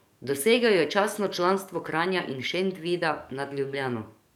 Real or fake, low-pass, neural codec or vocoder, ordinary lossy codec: fake; 19.8 kHz; codec, 44.1 kHz, 7.8 kbps, DAC; none